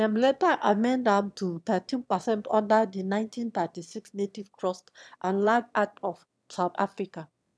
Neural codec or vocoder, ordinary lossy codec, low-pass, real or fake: autoencoder, 22.05 kHz, a latent of 192 numbers a frame, VITS, trained on one speaker; none; none; fake